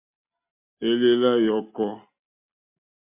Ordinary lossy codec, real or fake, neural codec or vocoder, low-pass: MP3, 32 kbps; real; none; 3.6 kHz